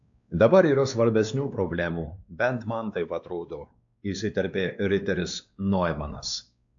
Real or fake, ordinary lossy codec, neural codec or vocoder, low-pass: fake; AAC, 64 kbps; codec, 16 kHz, 2 kbps, X-Codec, WavLM features, trained on Multilingual LibriSpeech; 7.2 kHz